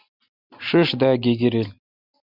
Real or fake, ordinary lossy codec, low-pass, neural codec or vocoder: real; Opus, 64 kbps; 5.4 kHz; none